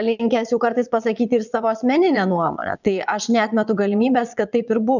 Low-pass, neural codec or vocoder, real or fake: 7.2 kHz; vocoder, 22.05 kHz, 80 mel bands, Vocos; fake